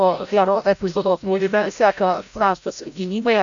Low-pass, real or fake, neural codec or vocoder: 7.2 kHz; fake; codec, 16 kHz, 0.5 kbps, FreqCodec, larger model